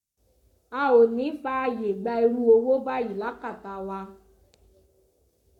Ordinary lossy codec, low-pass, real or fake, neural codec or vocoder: none; 19.8 kHz; fake; codec, 44.1 kHz, 7.8 kbps, Pupu-Codec